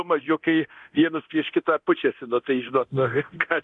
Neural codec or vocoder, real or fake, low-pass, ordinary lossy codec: codec, 24 kHz, 0.9 kbps, DualCodec; fake; 10.8 kHz; MP3, 96 kbps